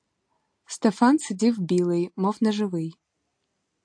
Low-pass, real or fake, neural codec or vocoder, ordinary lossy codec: 9.9 kHz; real; none; AAC, 64 kbps